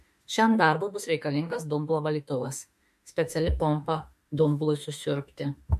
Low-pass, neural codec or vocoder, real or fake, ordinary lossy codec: 14.4 kHz; autoencoder, 48 kHz, 32 numbers a frame, DAC-VAE, trained on Japanese speech; fake; MP3, 64 kbps